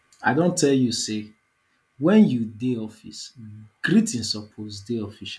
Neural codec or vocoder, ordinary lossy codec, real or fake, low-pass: none; none; real; none